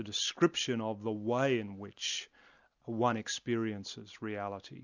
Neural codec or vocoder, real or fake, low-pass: none; real; 7.2 kHz